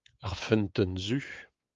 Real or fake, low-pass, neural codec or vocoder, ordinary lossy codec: fake; 7.2 kHz; codec, 16 kHz, 4 kbps, X-Codec, WavLM features, trained on Multilingual LibriSpeech; Opus, 32 kbps